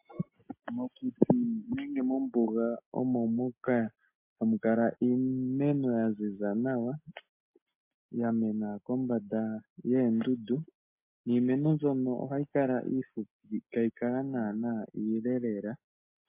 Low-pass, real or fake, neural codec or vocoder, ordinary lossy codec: 3.6 kHz; real; none; MP3, 24 kbps